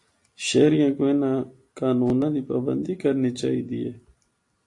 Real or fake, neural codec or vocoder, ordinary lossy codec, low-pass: real; none; AAC, 48 kbps; 10.8 kHz